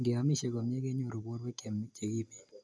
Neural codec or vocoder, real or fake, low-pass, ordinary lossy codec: none; real; none; none